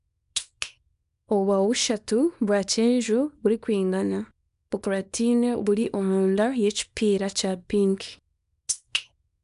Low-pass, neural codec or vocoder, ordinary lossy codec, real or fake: 10.8 kHz; codec, 24 kHz, 0.9 kbps, WavTokenizer, small release; none; fake